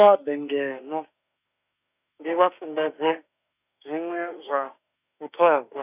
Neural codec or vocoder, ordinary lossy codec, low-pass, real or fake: autoencoder, 48 kHz, 32 numbers a frame, DAC-VAE, trained on Japanese speech; none; 3.6 kHz; fake